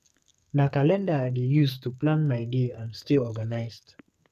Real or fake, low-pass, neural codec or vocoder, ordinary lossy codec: fake; 14.4 kHz; codec, 44.1 kHz, 2.6 kbps, SNAC; none